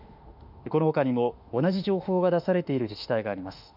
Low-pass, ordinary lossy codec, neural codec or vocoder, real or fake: 5.4 kHz; none; autoencoder, 48 kHz, 32 numbers a frame, DAC-VAE, trained on Japanese speech; fake